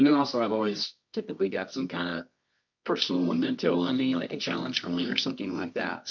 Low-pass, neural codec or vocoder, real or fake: 7.2 kHz; codec, 24 kHz, 0.9 kbps, WavTokenizer, medium music audio release; fake